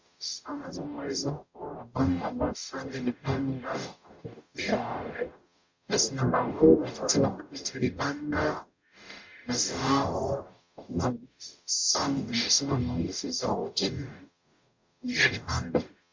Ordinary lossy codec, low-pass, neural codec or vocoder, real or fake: MP3, 48 kbps; 7.2 kHz; codec, 44.1 kHz, 0.9 kbps, DAC; fake